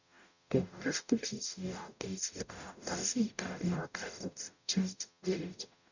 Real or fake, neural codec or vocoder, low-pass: fake; codec, 44.1 kHz, 0.9 kbps, DAC; 7.2 kHz